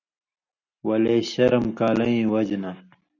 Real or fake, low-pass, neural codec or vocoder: real; 7.2 kHz; none